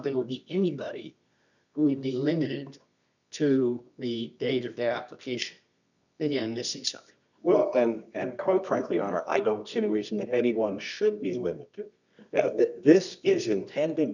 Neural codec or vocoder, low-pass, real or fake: codec, 24 kHz, 0.9 kbps, WavTokenizer, medium music audio release; 7.2 kHz; fake